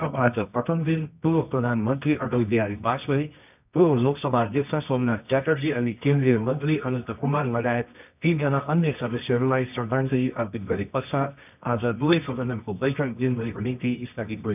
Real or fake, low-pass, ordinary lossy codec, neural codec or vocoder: fake; 3.6 kHz; none; codec, 24 kHz, 0.9 kbps, WavTokenizer, medium music audio release